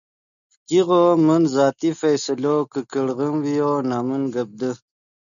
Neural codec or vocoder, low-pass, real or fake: none; 7.2 kHz; real